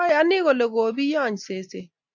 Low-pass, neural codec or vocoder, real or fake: 7.2 kHz; none; real